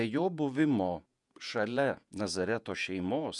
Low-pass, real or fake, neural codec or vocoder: 10.8 kHz; fake; vocoder, 48 kHz, 128 mel bands, Vocos